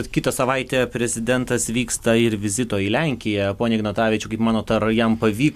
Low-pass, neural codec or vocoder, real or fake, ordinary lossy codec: 14.4 kHz; none; real; MP3, 96 kbps